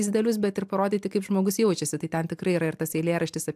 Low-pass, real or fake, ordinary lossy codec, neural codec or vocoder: 14.4 kHz; real; AAC, 96 kbps; none